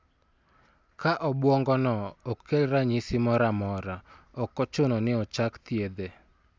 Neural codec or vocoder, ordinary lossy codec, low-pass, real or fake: none; none; none; real